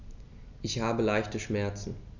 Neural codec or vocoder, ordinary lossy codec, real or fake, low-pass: none; none; real; 7.2 kHz